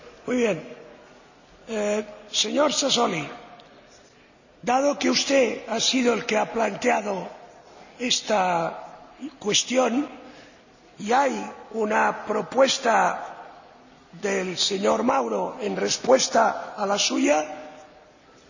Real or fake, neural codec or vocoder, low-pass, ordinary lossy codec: real; none; 7.2 kHz; none